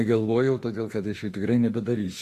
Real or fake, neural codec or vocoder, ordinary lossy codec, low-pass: fake; autoencoder, 48 kHz, 32 numbers a frame, DAC-VAE, trained on Japanese speech; AAC, 48 kbps; 14.4 kHz